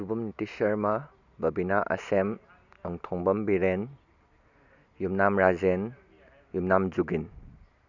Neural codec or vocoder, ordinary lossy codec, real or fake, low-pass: none; none; real; 7.2 kHz